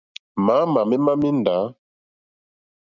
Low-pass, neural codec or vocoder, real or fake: 7.2 kHz; none; real